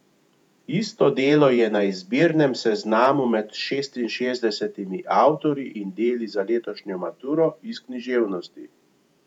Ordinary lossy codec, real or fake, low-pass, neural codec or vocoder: none; fake; 19.8 kHz; vocoder, 48 kHz, 128 mel bands, Vocos